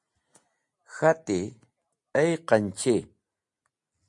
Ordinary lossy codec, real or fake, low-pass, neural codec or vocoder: MP3, 48 kbps; real; 10.8 kHz; none